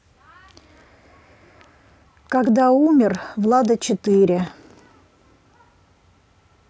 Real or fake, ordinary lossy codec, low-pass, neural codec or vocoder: real; none; none; none